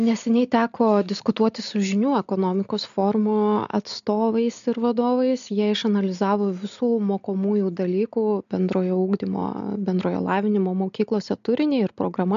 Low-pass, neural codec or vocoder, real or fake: 7.2 kHz; none; real